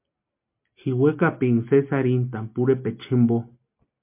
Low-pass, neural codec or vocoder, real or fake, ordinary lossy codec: 3.6 kHz; none; real; MP3, 32 kbps